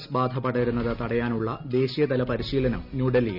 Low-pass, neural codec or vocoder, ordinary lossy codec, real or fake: 5.4 kHz; none; none; real